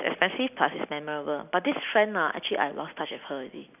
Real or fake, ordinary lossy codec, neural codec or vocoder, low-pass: real; none; none; 3.6 kHz